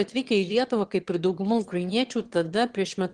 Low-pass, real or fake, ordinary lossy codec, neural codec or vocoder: 9.9 kHz; fake; Opus, 16 kbps; autoencoder, 22.05 kHz, a latent of 192 numbers a frame, VITS, trained on one speaker